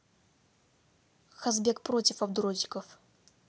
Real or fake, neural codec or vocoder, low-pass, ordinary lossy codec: real; none; none; none